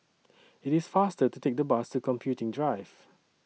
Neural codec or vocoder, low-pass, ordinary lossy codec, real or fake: none; none; none; real